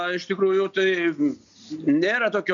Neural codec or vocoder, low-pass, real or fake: none; 7.2 kHz; real